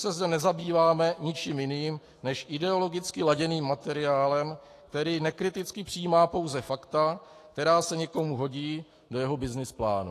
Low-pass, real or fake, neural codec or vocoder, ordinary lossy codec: 14.4 kHz; fake; autoencoder, 48 kHz, 128 numbers a frame, DAC-VAE, trained on Japanese speech; AAC, 48 kbps